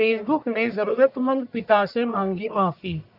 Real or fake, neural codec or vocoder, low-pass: fake; codec, 44.1 kHz, 1.7 kbps, Pupu-Codec; 5.4 kHz